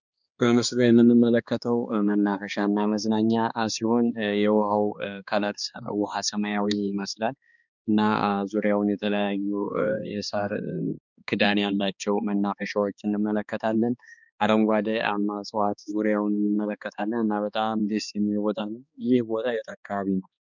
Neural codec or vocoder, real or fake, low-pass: codec, 16 kHz, 2 kbps, X-Codec, HuBERT features, trained on balanced general audio; fake; 7.2 kHz